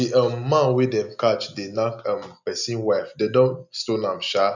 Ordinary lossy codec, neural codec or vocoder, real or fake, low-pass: none; none; real; 7.2 kHz